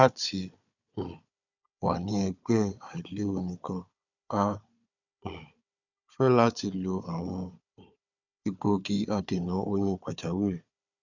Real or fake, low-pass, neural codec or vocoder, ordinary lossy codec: fake; 7.2 kHz; codec, 16 kHz, 4 kbps, FunCodec, trained on Chinese and English, 50 frames a second; none